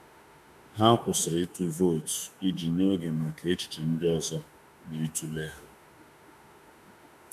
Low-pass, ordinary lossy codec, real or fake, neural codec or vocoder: 14.4 kHz; MP3, 96 kbps; fake; autoencoder, 48 kHz, 32 numbers a frame, DAC-VAE, trained on Japanese speech